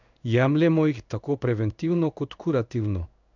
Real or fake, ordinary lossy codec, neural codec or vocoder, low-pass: fake; none; codec, 16 kHz in and 24 kHz out, 1 kbps, XY-Tokenizer; 7.2 kHz